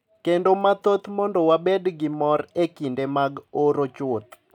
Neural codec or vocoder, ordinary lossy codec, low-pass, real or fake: none; none; 19.8 kHz; real